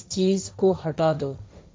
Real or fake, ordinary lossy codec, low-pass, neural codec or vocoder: fake; none; none; codec, 16 kHz, 1.1 kbps, Voila-Tokenizer